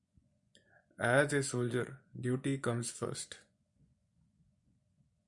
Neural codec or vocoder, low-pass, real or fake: vocoder, 24 kHz, 100 mel bands, Vocos; 10.8 kHz; fake